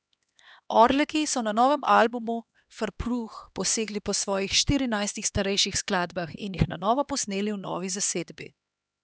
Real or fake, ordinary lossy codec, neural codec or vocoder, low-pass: fake; none; codec, 16 kHz, 2 kbps, X-Codec, HuBERT features, trained on LibriSpeech; none